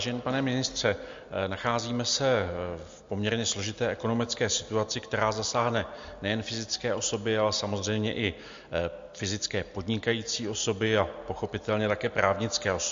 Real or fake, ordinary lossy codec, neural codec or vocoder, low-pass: real; MP3, 48 kbps; none; 7.2 kHz